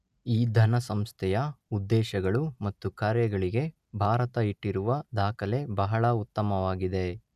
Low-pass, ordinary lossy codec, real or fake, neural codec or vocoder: 14.4 kHz; none; real; none